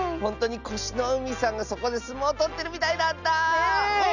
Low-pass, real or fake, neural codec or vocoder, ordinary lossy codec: 7.2 kHz; real; none; none